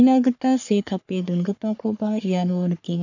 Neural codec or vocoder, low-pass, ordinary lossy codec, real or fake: codec, 44.1 kHz, 3.4 kbps, Pupu-Codec; 7.2 kHz; none; fake